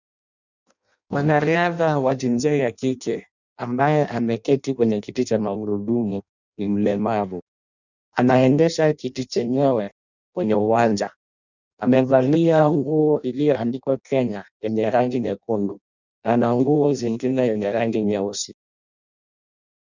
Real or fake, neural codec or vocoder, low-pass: fake; codec, 16 kHz in and 24 kHz out, 0.6 kbps, FireRedTTS-2 codec; 7.2 kHz